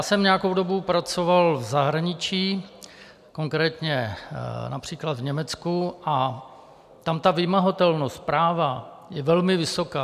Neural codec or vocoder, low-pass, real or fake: none; 14.4 kHz; real